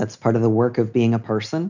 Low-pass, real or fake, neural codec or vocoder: 7.2 kHz; real; none